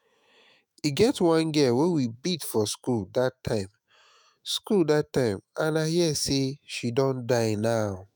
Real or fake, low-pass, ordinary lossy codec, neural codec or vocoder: fake; none; none; autoencoder, 48 kHz, 128 numbers a frame, DAC-VAE, trained on Japanese speech